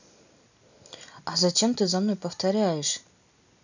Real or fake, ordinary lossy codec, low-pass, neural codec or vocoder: real; none; 7.2 kHz; none